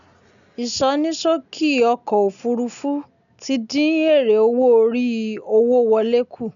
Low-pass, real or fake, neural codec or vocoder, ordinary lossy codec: 7.2 kHz; real; none; none